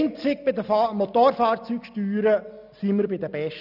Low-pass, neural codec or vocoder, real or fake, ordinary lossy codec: 5.4 kHz; none; real; none